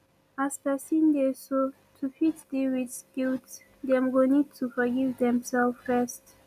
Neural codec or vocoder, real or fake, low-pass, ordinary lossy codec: none; real; 14.4 kHz; none